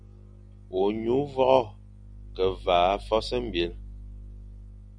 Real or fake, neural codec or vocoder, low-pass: real; none; 9.9 kHz